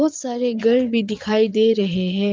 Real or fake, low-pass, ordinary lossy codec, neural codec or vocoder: fake; 7.2 kHz; Opus, 24 kbps; vocoder, 22.05 kHz, 80 mel bands, Vocos